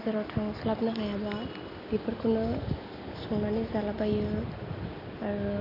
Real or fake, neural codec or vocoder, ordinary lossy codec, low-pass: real; none; none; 5.4 kHz